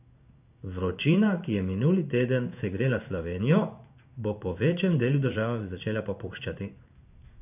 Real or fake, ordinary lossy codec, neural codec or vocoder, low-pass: fake; none; codec, 16 kHz in and 24 kHz out, 1 kbps, XY-Tokenizer; 3.6 kHz